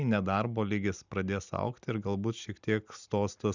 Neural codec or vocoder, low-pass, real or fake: none; 7.2 kHz; real